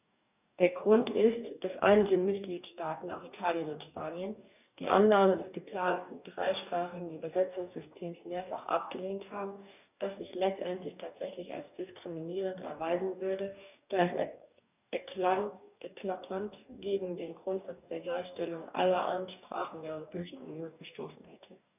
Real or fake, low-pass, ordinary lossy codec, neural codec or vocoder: fake; 3.6 kHz; none; codec, 44.1 kHz, 2.6 kbps, DAC